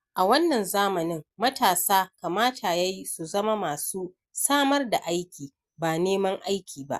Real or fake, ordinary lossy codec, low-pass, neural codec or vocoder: real; Opus, 64 kbps; 14.4 kHz; none